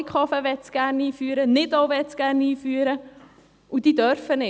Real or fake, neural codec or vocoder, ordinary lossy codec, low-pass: real; none; none; none